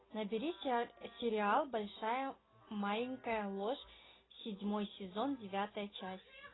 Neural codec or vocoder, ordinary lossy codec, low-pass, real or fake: none; AAC, 16 kbps; 7.2 kHz; real